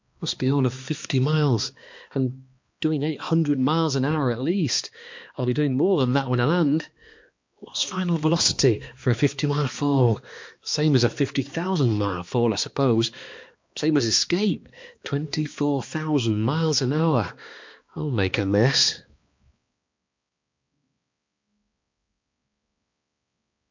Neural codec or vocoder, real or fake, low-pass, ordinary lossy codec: codec, 16 kHz, 2 kbps, X-Codec, HuBERT features, trained on balanced general audio; fake; 7.2 kHz; MP3, 48 kbps